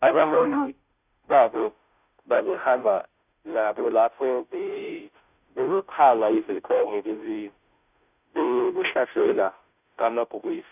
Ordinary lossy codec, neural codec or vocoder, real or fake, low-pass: none; codec, 16 kHz, 0.5 kbps, FunCodec, trained on Chinese and English, 25 frames a second; fake; 3.6 kHz